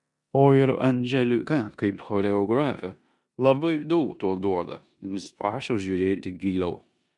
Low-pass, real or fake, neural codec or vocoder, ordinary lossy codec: 10.8 kHz; fake; codec, 16 kHz in and 24 kHz out, 0.9 kbps, LongCat-Audio-Codec, four codebook decoder; MP3, 96 kbps